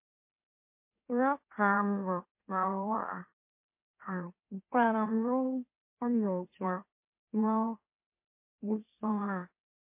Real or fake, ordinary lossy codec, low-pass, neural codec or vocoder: fake; MP3, 24 kbps; 3.6 kHz; autoencoder, 44.1 kHz, a latent of 192 numbers a frame, MeloTTS